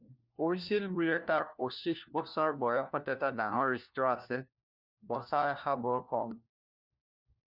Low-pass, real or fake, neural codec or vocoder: 5.4 kHz; fake; codec, 16 kHz, 1 kbps, FunCodec, trained on LibriTTS, 50 frames a second